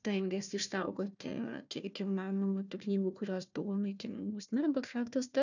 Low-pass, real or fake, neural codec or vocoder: 7.2 kHz; fake; codec, 16 kHz, 1 kbps, FunCodec, trained on Chinese and English, 50 frames a second